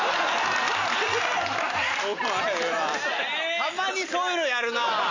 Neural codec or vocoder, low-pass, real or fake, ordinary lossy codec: none; 7.2 kHz; real; AAC, 48 kbps